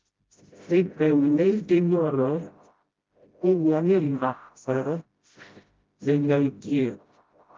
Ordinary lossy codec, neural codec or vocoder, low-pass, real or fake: Opus, 32 kbps; codec, 16 kHz, 0.5 kbps, FreqCodec, smaller model; 7.2 kHz; fake